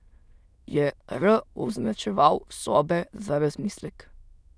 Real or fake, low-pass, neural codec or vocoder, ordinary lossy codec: fake; none; autoencoder, 22.05 kHz, a latent of 192 numbers a frame, VITS, trained on many speakers; none